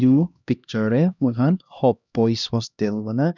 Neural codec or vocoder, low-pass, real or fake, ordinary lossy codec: codec, 16 kHz, 1 kbps, X-Codec, HuBERT features, trained on LibriSpeech; 7.2 kHz; fake; none